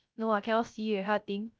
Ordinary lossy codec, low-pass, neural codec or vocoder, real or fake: none; none; codec, 16 kHz, 0.3 kbps, FocalCodec; fake